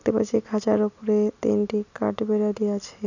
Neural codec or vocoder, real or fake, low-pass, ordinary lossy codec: none; real; 7.2 kHz; none